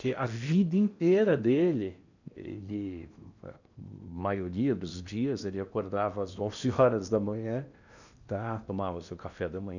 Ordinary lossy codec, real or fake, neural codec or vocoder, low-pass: none; fake; codec, 16 kHz in and 24 kHz out, 0.8 kbps, FocalCodec, streaming, 65536 codes; 7.2 kHz